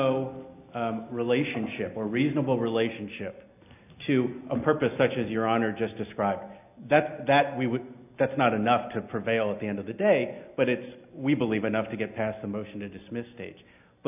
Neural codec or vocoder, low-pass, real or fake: none; 3.6 kHz; real